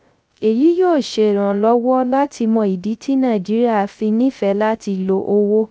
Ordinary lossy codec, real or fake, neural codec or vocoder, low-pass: none; fake; codec, 16 kHz, 0.3 kbps, FocalCodec; none